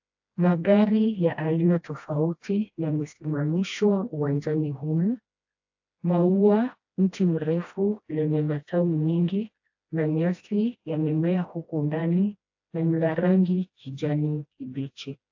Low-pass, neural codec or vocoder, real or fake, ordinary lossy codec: 7.2 kHz; codec, 16 kHz, 1 kbps, FreqCodec, smaller model; fake; AAC, 48 kbps